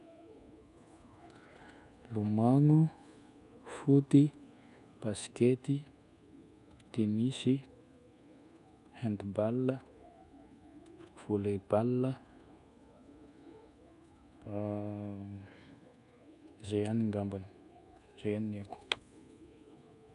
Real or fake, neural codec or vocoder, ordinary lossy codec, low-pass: fake; codec, 24 kHz, 1.2 kbps, DualCodec; none; 10.8 kHz